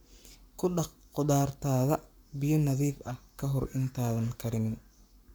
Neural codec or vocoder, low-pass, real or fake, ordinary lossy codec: codec, 44.1 kHz, 7.8 kbps, Pupu-Codec; none; fake; none